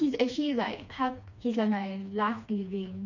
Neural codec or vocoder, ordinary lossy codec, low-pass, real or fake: codec, 16 kHz, 2 kbps, FreqCodec, smaller model; none; 7.2 kHz; fake